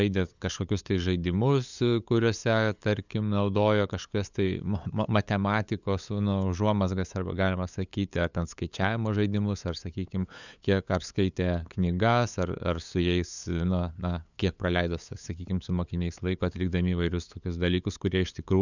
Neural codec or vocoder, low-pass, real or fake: codec, 16 kHz, 8 kbps, FunCodec, trained on LibriTTS, 25 frames a second; 7.2 kHz; fake